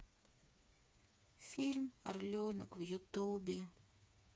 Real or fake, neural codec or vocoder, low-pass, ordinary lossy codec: fake; codec, 16 kHz, 4 kbps, FreqCodec, smaller model; none; none